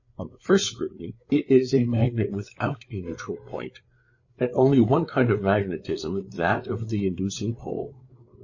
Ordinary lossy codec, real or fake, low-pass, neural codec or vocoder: MP3, 32 kbps; fake; 7.2 kHz; codec, 16 kHz, 8 kbps, FreqCodec, larger model